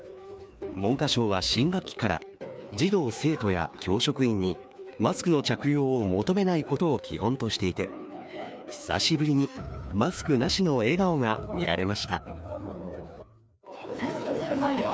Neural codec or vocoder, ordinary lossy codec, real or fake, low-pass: codec, 16 kHz, 2 kbps, FreqCodec, larger model; none; fake; none